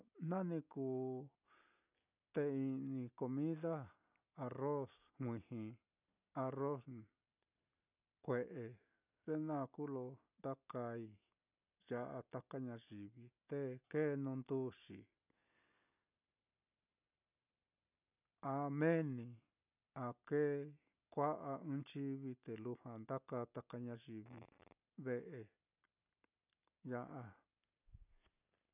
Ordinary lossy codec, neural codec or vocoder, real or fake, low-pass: none; none; real; 3.6 kHz